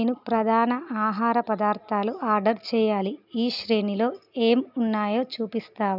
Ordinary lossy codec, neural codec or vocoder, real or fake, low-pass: none; none; real; 5.4 kHz